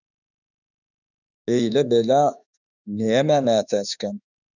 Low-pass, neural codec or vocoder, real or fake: 7.2 kHz; autoencoder, 48 kHz, 32 numbers a frame, DAC-VAE, trained on Japanese speech; fake